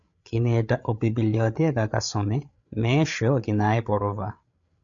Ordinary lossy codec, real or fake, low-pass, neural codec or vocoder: MP3, 64 kbps; fake; 7.2 kHz; codec, 16 kHz, 8 kbps, FreqCodec, larger model